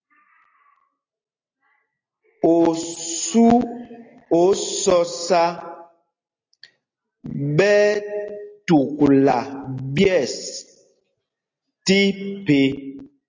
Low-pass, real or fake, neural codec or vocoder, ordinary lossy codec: 7.2 kHz; real; none; AAC, 32 kbps